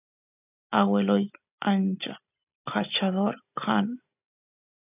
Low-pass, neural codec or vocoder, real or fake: 3.6 kHz; none; real